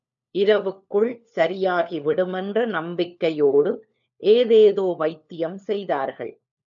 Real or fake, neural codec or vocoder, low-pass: fake; codec, 16 kHz, 4 kbps, FunCodec, trained on LibriTTS, 50 frames a second; 7.2 kHz